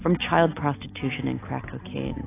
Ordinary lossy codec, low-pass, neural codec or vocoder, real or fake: AAC, 24 kbps; 3.6 kHz; none; real